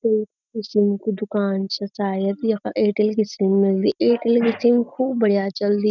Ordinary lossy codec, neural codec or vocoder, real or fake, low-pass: none; none; real; 7.2 kHz